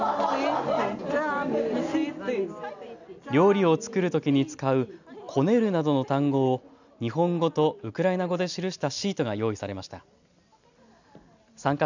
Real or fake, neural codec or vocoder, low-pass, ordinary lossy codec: real; none; 7.2 kHz; none